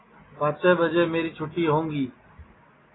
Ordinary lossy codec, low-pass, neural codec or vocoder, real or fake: AAC, 16 kbps; 7.2 kHz; none; real